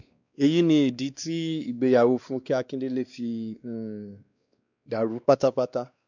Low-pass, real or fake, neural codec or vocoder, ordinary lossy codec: 7.2 kHz; fake; codec, 16 kHz, 2 kbps, X-Codec, WavLM features, trained on Multilingual LibriSpeech; none